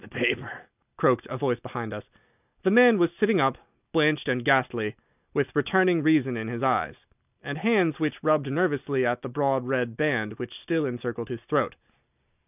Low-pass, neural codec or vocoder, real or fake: 3.6 kHz; none; real